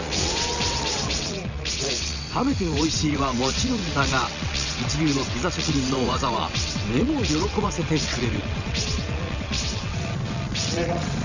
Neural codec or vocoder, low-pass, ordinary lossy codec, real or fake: vocoder, 22.05 kHz, 80 mel bands, WaveNeXt; 7.2 kHz; none; fake